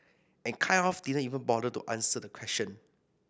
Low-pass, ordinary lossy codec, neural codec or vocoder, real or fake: none; none; none; real